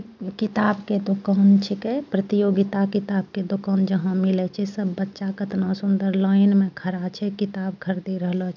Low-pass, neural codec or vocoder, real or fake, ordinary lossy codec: 7.2 kHz; none; real; none